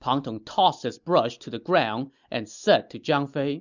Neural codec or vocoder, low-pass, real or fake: none; 7.2 kHz; real